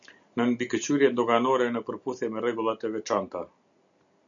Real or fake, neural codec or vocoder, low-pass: real; none; 7.2 kHz